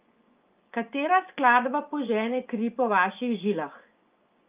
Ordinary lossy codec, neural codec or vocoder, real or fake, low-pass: Opus, 24 kbps; vocoder, 24 kHz, 100 mel bands, Vocos; fake; 3.6 kHz